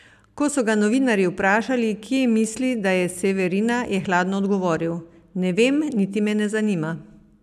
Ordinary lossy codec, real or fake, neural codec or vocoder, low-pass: none; real; none; 14.4 kHz